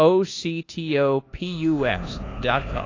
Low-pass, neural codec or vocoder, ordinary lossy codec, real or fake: 7.2 kHz; codec, 24 kHz, 1.2 kbps, DualCodec; AAC, 32 kbps; fake